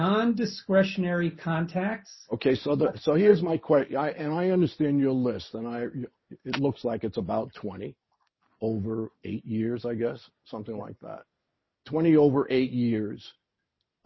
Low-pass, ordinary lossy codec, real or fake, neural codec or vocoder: 7.2 kHz; MP3, 24 kbps; real; none